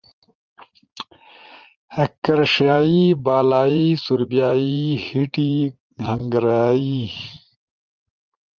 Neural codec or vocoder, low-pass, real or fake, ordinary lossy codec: vocoder, 44.1 kHz, 80 mel bands, Vocos; 7.2 kHz; fake; Opus, 24 kbps